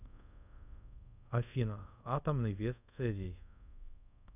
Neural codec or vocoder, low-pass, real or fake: codec, 24 kHz, 0.5 kbps, DualCodec; 3.6 kHz; fake